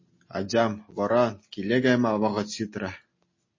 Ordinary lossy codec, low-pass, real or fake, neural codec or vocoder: MP3, 32 kbps; 7.2 kHz; real; none